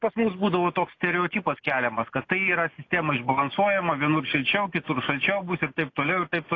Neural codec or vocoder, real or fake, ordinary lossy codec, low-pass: none; real; AAC, 32 kbps; 7.2 kHz